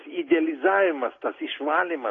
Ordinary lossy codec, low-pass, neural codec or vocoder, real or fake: AAC, 32 kbps; 7.2 kHz; none; real